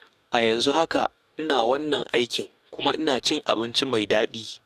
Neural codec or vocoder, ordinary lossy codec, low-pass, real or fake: codec, 44.1 kHz, 2.6 kbps, DAC; none; 14.4 kHz; fake